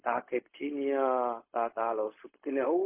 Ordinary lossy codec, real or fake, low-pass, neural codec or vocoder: MP3, 24 kbps; fake; 3.6 kHz; codec, 16 kHz, 0.4 kbps, LongCat-Audio-Codec